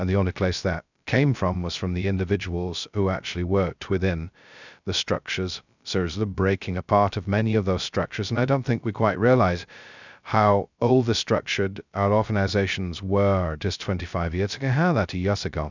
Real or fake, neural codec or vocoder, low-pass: fake; codec, 16 kHz, 0.2 kbps, FocalCodec; 7.2 kHz